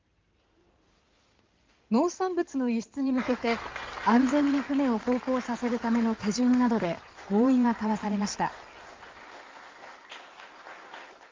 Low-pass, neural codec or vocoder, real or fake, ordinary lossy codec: 7.2 kHz; codec, 16 kHz in and 24 kHz out, 2.2 kbps, FireRedTTS-2 codec; fake; Opus, 24 kbps